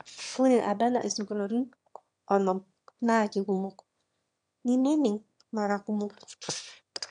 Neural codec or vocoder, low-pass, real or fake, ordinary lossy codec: autoencoder, 22.05 kHz, a latent of 192 numbers a frame, VITS, trained on one speaker; 9.9 kHz; fake; MP3, 64 kbps